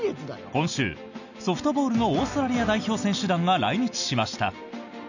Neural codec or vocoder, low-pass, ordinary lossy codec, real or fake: none; 7.2 kHz; none; real